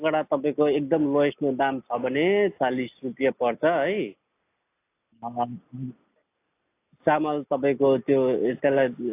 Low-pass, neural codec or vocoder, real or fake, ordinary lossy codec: 3.6 kHz; none; real; AAC, 24 kbps